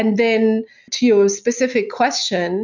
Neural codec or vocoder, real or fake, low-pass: none; real; 7.2 kHz